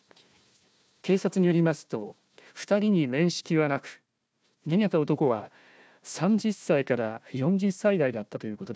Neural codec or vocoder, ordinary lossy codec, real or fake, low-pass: codec, 16 kHz, 1 kbps, FunCodec, trained on Chinese and English, 50 frames a second; none; fake; none